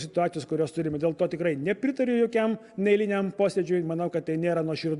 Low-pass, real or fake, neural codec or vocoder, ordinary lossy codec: 10.8 kHz; real; none; Opus, 64 kbps